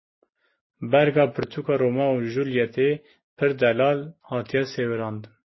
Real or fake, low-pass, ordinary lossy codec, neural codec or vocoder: real; 7.2 kHz; MP3, 24 kbps; none